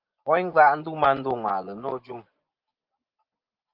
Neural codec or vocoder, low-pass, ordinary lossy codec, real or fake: vocoder, 24 kHz, 100 mel bands, Vocos; 5.4 kHz; Opus, 32 kbps; fake